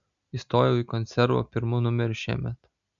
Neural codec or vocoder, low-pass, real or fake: none; 7.2 kHz; real